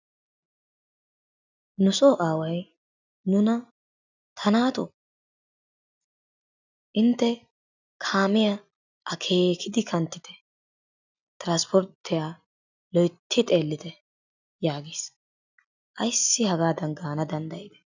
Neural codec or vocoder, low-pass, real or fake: none; 7.2 kHz; real